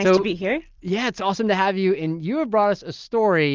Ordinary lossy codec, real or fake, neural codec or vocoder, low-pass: Opus, 24 kbps; real; none; 7.2 kHz